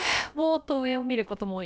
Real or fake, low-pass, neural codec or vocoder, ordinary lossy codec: fake; none; codec, 16 kHz, about 1 kbps, DyCAST, with the encoder's durations; none